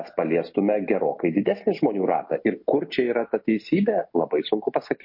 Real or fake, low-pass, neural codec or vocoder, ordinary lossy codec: real; 5.4 kHz; none; MP3, 32 kbps